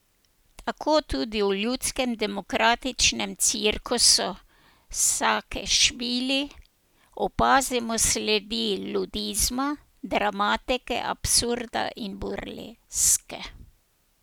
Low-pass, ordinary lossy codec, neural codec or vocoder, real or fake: none; none; none; real